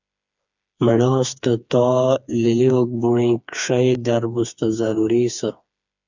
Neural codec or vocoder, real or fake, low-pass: codec, 16 kHz, 4 kbps, FreqCodec, smaller model; fake; 7.2 kHz